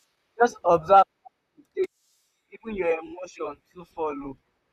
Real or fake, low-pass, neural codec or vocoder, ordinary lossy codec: fake; 14.4 kHz; vocoder, 44.1 kHz, 128 mel bands, Pupu-Vocoder; none